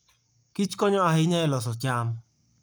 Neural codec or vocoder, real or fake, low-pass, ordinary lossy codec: codec, 44.1 kHz, 7.8 kbps, Pupu-Codec; fake; none; none